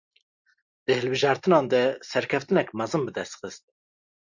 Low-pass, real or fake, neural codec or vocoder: 7.2 kHz; real; none